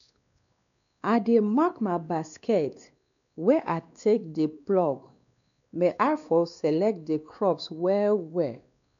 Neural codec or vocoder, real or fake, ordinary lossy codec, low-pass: codec, 16 kHz, 2 kbps, X-Codec, WavLM features, trained on Multilingual LibriSpeech; fake; none; 7.2 kHz